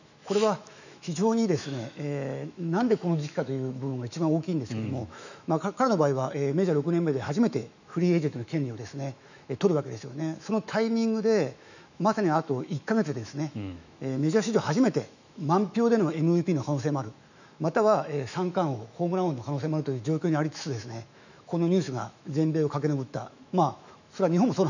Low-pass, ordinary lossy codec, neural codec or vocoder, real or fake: 7.2 kHz; none; autoencoder, 48 kHz, 128 numbers a frame, DAC-VAE, trained on Japanese speech; fake